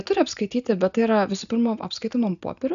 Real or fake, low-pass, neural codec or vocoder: real; 7.2 kHz; none